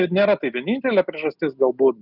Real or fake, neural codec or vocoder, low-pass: real; none; 5.4 kHz